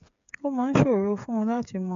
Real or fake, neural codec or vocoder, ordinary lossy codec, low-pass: fake; codec, 16 kHz, 8 kbps, FreqCodec, smaller model; AAC, 64 kbps; 7.2 kHz